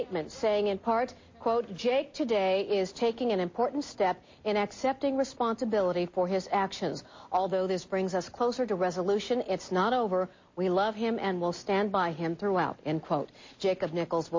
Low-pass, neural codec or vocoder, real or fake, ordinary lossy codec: 7.2 kHz; none; real; MP3, 32 kbps